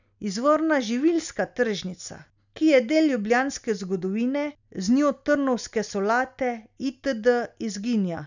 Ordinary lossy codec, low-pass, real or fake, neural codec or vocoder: none; 7.2 kHz; real; none